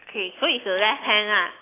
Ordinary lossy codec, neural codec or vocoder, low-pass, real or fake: AAC, 24 kbps; none; 3.6 kHz; real